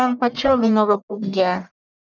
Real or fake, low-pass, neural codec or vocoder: fake; 7.2 kHz; codec, 44.1 kHz, 1.7 kbps, Pupu-Codec